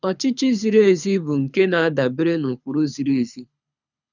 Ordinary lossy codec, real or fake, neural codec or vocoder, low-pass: none; fake; codec, 24 kHz, 6 kbps, HILCodec; 7.2 kHz